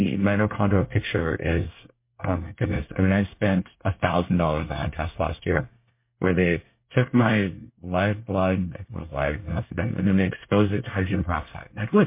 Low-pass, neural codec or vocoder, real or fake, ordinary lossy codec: 3.6 kHz; codec, 24 kHz, 1 kbps, SNAC; fake; MP3, 24 kbps